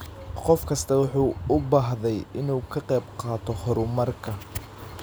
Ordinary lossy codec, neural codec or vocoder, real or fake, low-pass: none; none; real; none